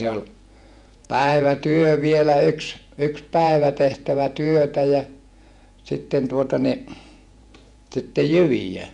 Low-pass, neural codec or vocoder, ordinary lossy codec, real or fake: 10.8 kHz; vocoder, 48 kHz, 128 mel bands, Vocos; none; fake